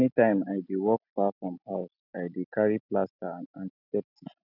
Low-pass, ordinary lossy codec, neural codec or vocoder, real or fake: 5.4 kHz; none; none; real